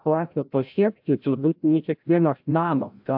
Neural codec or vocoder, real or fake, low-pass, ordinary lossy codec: codec, 16 kHz, 0.5 kbps, FreqCodec, larger model; fake; 5.4 kHz; AAC, 48 kbps